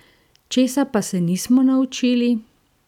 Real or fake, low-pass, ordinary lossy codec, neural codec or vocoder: real; 19.8 kHz; none; none